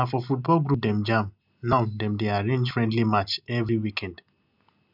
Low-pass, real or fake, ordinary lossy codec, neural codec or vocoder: 5.4 kHz; real; none; none